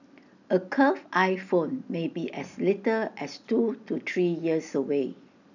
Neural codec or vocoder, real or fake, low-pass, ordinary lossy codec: none; real; 7.2 kHz; none